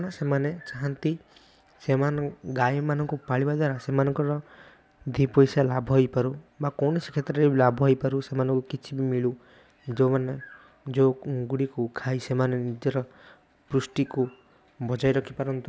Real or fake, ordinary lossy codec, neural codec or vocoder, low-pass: real; none; none; none